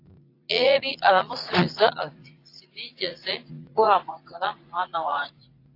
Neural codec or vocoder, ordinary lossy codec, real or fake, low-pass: vocoder, 44.1 kHz, 80 mel bands, Vocos; AAC, 32 kbps; fake; 5.4 kHz